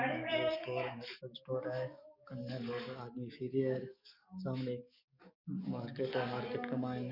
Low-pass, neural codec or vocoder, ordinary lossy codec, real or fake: 5.4 kHz; codec, 44.1 kHz, 7.8 kbps, DAC; none; fake